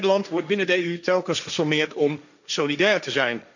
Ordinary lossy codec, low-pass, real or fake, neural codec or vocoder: none; 7.2 kHz; fake; codec, 16 kHz, 1.1 kbps, Voila-Tokenizer